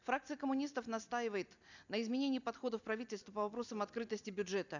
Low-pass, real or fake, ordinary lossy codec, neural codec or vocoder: 7.2 kHz; fake; none; vocoder, 44.1 kHz, 128 mel bands every 256 samples, BigVGAN v2